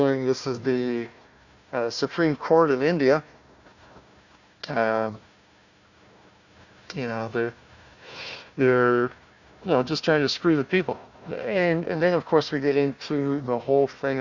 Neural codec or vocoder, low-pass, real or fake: codec, 16 kHz, 1 kbps, FunCodec, trained on Chinese and English, 50 frames a second; 7.2 kHz; fake